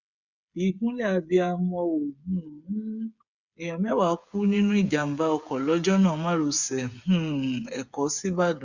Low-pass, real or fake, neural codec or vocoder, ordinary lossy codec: 7.2 kHz; fake; codec, 16 kHz, 8 kbps, FreqCodec, smaller model; Opus, 64 kbps